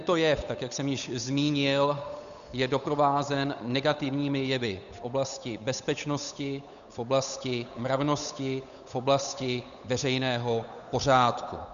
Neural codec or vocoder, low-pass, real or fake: codec, 16 kHz, 8 kbps, FunCodec, trained on Chinese and English, 25 frames a second; 7.2 kHz; fake